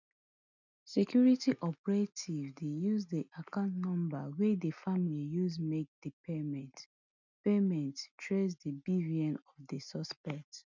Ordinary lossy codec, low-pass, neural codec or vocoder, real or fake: none; 7.2 kHz; none; real